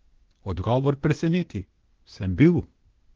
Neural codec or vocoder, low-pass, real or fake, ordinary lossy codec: codec, 16 kHz, 0.8 kbps, ZipCodec; 7.2 kHz; fake; Opus, 16 kbps